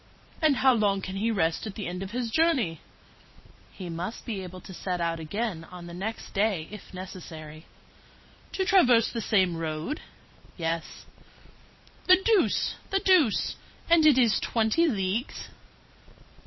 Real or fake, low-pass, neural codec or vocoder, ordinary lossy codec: fake; 7.2 kHz; vocoder, 44.1 kHz, 128 mel bands every 512 samples, BigVGAN v2; MP3, 24 kbps